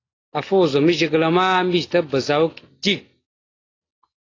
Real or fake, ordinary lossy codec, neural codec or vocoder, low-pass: real; AAC, 32 kbps; none; 7.2 kHz